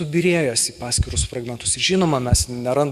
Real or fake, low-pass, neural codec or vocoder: fake; 14.4 kHz; autoencoder, 48 kHz, 128 numbers a frame, DAC-VAE, trained on Japanese speech